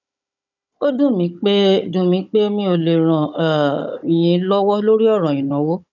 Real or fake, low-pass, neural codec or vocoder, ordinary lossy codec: fake; 7.2 kHz; codec, 16 kHz, 16 kbps, FunCodec, trained on Chinese and English, 50 frames a second; none